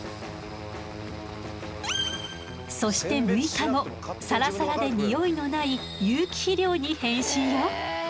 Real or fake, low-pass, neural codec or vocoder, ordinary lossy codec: real; none; none; none